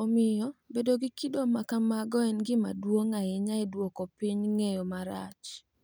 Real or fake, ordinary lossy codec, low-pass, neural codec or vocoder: real; none; none; none